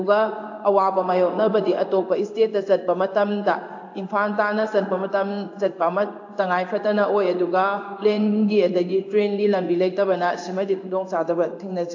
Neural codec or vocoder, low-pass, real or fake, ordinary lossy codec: codec, 16 kHz in and 24 kHz out, 1 kbps, XY-Tokenizer; 7.2 kHz; fake; AAC, 48 kbps